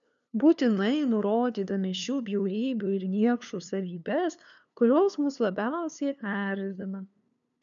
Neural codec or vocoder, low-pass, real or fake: codec, 16 kHz, 2 kbps, FunCodec, trained on LibriTTS, 25 frames a second; 7.2 kHz; fake